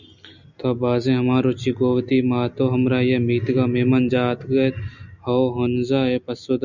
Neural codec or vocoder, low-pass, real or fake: none; 7.2 kHz; real